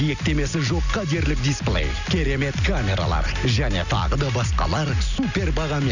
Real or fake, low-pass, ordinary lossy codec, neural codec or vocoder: real; 7.2 kHz; none; none